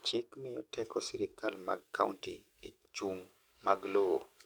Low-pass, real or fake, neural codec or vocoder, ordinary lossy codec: none; fake; codec, 44.1 kHz, 7.8 kbps, DAC; none